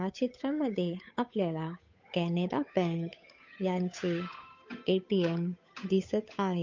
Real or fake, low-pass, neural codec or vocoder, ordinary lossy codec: fake; 7.2 kHz; codec, 16 kHz, 8 kbps, FunCodec, trained on Chinese and English, 25 frames a second; MP3, 48 kbps